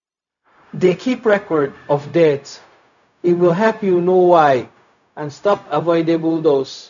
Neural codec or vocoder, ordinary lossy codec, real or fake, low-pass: codec, 16 kHz, 0.4 kbps, LongCat-Audio-Codec; none; fake; 7.2 kHz